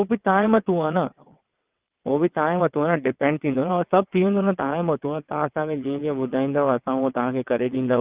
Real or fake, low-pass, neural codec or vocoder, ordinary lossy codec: fake; 3.6 kHz; vocoder, 22.05 kHz, 80 mel bands, WaveNeXt; Opus, 24 kbps